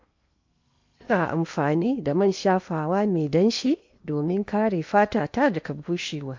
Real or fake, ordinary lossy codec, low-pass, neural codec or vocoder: fake; MP3, 48 kbps; 7.2 kHz; codec, 16 kHz in and 24 kHz out, 0.8 kbps, FocalCodec, streaming, 65536 codes